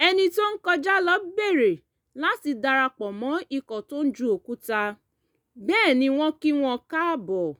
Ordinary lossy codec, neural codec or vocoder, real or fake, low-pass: none; none; real; none